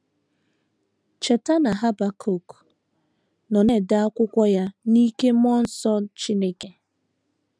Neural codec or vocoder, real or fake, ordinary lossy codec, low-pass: none; real; none; none